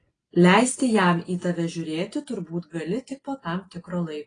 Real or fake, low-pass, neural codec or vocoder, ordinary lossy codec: real; 9.9 kHz; none; AAC, 32 kbps